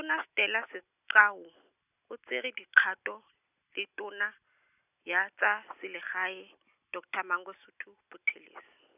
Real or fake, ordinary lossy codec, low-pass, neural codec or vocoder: real; none; 3.6 kHz; none